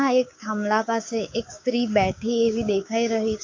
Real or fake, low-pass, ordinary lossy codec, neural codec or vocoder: fake; 7.2 kHz; AAC, 48 kbps; codec, 16 kHz, 6 kbps, DAC